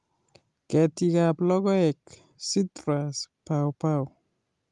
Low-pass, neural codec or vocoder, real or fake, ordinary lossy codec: 10.8 kHz; none; real; Opus, 32 kbps